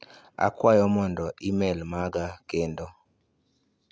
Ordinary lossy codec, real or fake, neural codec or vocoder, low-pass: none; real; none; none